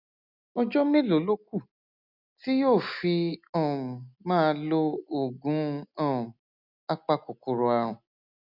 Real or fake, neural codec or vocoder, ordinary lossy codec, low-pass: real; none; none; 5.4 kHz